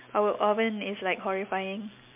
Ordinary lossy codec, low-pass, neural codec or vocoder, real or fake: MP3, 32 kbps; 3.6 kHz; none; real